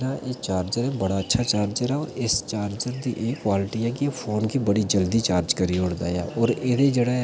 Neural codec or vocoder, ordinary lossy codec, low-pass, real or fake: none; none; none; real